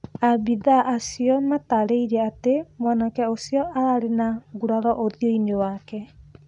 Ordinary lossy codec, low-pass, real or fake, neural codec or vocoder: none; 10.8 kHz; real; none